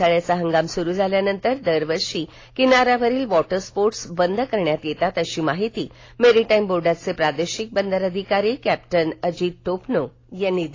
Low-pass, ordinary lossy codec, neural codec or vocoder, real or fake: 7.2 kHz; AAC, 32 kbps; none; real